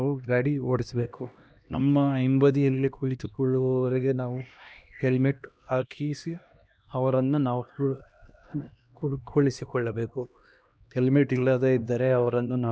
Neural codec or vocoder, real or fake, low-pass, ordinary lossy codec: codec, 16 kHz, 1 kbps, X-Codec, HuBERT features, trained on LibriSpeech; fake; none; none